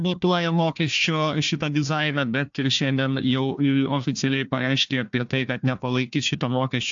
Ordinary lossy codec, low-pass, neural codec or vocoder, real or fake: AAC, 64 kbps; 7.2 kHz; codec, 16 kHz, 1 kbps, FunCodec, trained on Chinese and English, 50 frames a second; fake